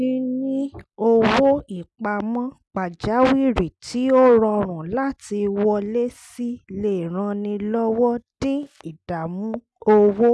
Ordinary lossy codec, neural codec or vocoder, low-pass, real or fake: none; none; none; real